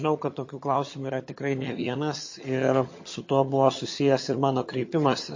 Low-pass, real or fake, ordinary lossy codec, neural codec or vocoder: 7.2 kHz; fake; MP3, 32 kbps; vocoder, 22.05 kHz, 80 mel bands, HiFi-GAN